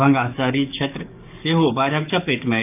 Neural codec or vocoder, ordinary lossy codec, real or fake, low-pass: codec, 44.1 kHz, 7.8 kbps, DAC; none; fake; 3.6 kHz